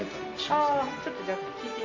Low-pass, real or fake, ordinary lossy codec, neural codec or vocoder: 7.2 kHz; real; AAC, 32 kbps; none